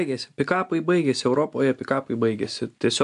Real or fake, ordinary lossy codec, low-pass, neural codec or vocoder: real; AAC, 96 kbps; 10.8 kHz; none